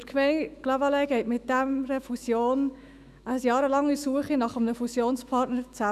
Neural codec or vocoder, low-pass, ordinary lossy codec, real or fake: autoencoder, 48 kHz, 128 numbers a frame, DAC-VAE, trained on Japanese speech; 14.4 kHz; none; fake